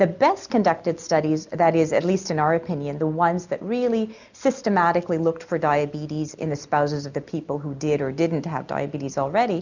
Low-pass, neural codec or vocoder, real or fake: 7.2 kHz; none; real